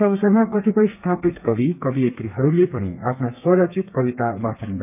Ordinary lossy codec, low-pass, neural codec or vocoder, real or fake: none; 3.6 kHz; codec, 32 kHz, 1.9 kbps, SNAC; fake